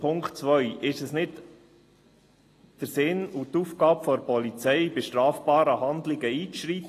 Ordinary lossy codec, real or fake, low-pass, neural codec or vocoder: AAC, 48 kbps; real; 14.4 kHz; none